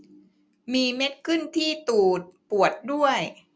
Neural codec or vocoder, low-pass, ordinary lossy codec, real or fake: none; none; none; real